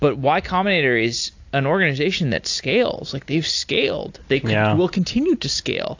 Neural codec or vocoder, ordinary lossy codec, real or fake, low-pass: none; AAC, 48 kbps; real; 7.2 kHz